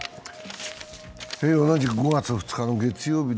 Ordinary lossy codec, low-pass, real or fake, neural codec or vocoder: none; none; real; none